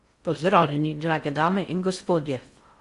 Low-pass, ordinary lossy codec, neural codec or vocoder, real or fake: 10.8 kHz; Opus, 64 kbps; codec, 16 kHz in and 24 kHz out, 0.6 kbps, FocalCodec, streaming, 4096 codes; fake